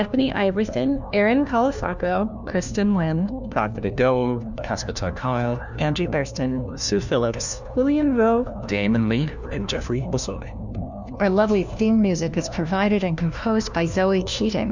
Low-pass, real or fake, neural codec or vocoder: 7.2 kHz; fake; codec, 16 kHz, 1 kbps, FunCodec, trained on LibriTTS, 50 frames a second